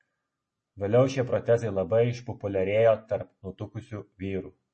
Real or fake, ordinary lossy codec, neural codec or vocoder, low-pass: real; MP3, 32 kbps; none; 10.8 kHz